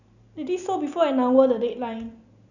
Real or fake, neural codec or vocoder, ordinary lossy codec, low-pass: real; none; none; 7.2 kHz